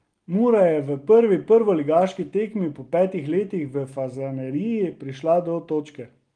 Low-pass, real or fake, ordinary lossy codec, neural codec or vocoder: 9.9 kHz; real; Opus, 24 kbps; none